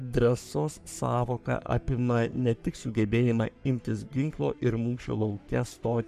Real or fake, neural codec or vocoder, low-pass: fake; codec, 44.1 kHz, 3.4 kbps, Pupu-Codec; 14.4 kHz